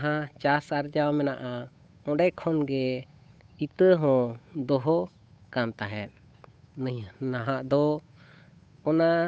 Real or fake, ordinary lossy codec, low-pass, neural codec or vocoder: fake; none; none; codec, 16 kHz, 8 kbps, FunCodec, trained on Chinese and English, 25 frames a second